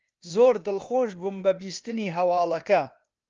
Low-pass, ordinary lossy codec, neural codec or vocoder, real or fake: 7.2 kHz; Opus, 24 kbps; codec, 16 kHz, 0.8 kbps, ZipCodec; fake